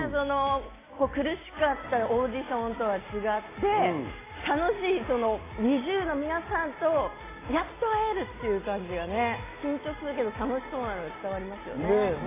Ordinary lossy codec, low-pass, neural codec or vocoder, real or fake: AAC, 16 kbps; 3.6 kHz; none; real